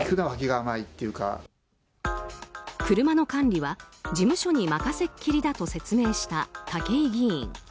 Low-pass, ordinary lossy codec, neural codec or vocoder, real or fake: none; none; none; real